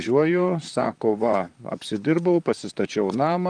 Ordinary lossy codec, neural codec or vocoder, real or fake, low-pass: Opus, 32 kbps; vocoder, 44.1 kHz, 128 mel bands, Pupu-Vocoder; fake; 9.9 kHz